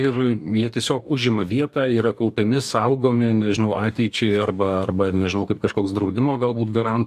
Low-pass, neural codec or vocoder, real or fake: 14.4 kHz; codec, 44.1 kHz, 2.6 kbps, DAC; fake